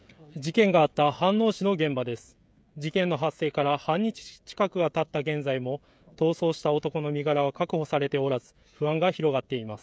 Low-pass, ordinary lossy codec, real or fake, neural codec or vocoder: none; none; fake; codec, 16 kHz, 16 kbps, FreqCodec, smaller model